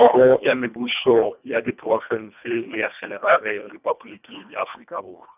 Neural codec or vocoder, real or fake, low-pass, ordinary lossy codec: codec, 24 kHz, 1.5 kbps, HILCodec; fake; 3.6 kHz; none